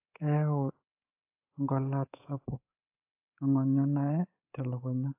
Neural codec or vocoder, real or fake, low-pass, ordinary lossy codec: codec, 24 kHz, 3.1 kbps, DualCodec; fake; 3.6 kHz; AAC, 24 kbps